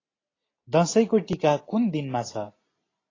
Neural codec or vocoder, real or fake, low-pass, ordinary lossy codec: none; real; 7.2 kHz; AAC, 32 kbps